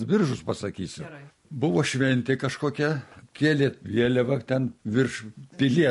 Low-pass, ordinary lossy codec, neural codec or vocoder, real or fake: 14.4 kHz; MP3, 48 kbps; none; real